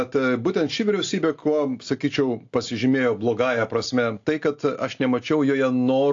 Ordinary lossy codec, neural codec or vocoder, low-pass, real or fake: AAC, 48 kbps; none; 7.2 kHz; real